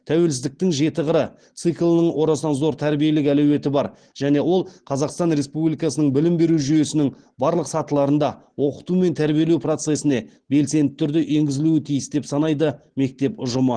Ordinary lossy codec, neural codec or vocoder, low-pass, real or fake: Opus, 16 kbps; none; 9.9 kHz; real